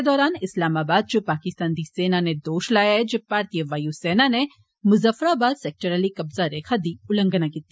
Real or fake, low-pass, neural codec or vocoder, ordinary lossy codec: real; none; none; none